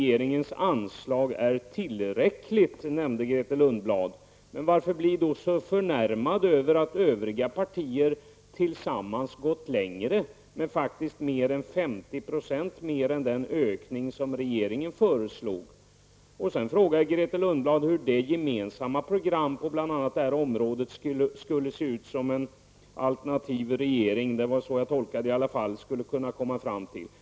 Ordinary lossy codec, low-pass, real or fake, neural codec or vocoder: none; none; real; none